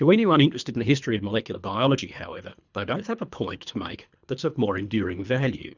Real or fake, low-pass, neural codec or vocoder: fake; 7.2 kHz; codec, 24 kHz, 3 kbps, HILCodec